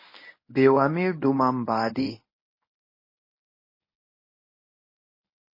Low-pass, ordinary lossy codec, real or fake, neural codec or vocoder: 5.4 kHz; MP3, 24 kbps; fake; codec, 24 kHz, 0.9 kbps, WavTokenizer, medium speech release version 1